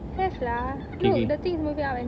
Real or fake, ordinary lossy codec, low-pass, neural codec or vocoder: real; none; none; none